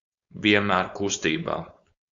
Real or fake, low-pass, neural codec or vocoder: fake; 7.2 kHz; codec, 16 kHz, 4.8 kbps, FACodec